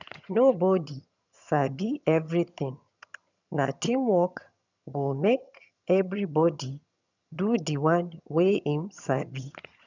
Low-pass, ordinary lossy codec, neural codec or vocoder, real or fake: 7.2 kHz; none; vocoder, 22.05 kHz, 80 mel bands, HiFi-GAN; fake